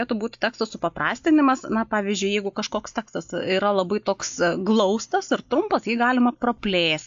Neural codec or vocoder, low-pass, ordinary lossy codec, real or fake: none; 7.2 kHz; AAC, 64 kbps; real